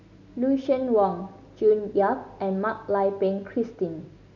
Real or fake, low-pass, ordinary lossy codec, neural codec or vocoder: real; 7.2 kHz; none; none